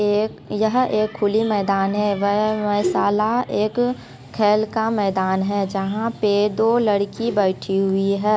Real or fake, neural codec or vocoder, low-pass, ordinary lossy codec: real; none; none; none